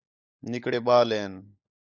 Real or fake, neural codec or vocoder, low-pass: fake; codec, 16 kHz, 16 kbps, FunCodec, trained on LibriTTS, 50 frames a second; 7.2 kHz